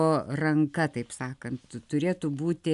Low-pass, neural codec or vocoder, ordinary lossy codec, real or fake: 10.8 kHz; none; MP3, 96 kbps; real